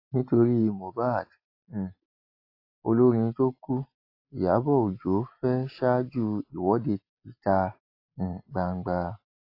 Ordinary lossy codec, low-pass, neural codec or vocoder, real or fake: AAC, 32 kbps; 5.4 kHz; none; real